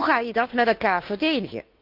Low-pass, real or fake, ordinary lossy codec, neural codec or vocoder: 5.4 kHz; fake; Opus, 32 kbps; codec, 16 kHz, 2 kbps, FunCodec, trained on Chinese and English, 25 frames a second